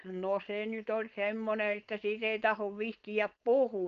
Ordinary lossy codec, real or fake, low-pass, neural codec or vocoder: Opus, 24 kbps; fake; 7.2 kHz; codec, 16 kHz, 4.8 kbps, FACodec